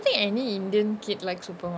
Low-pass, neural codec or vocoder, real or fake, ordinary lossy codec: none; none; real; none